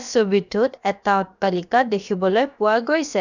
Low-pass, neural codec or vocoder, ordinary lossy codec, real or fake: 7.2 kHz; codec, 16 kHz, about 1 kbps, DyCAST, with the encoder's durations; none; fake